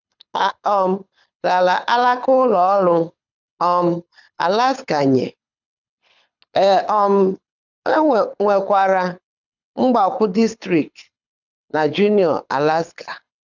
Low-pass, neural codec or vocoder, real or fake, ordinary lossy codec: 7.2 kHz; codec, 24 kHz, 6 kbps, HILCodec; fake; none